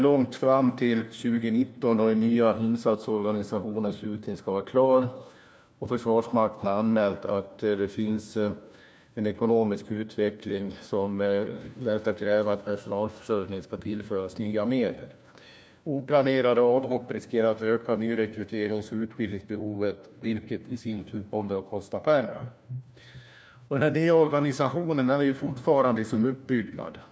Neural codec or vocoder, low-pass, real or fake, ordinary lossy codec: codec, 16 kHz, 1 kbps, FunCodec, trained on LibriTTS, 50 frames a second; none; fake; none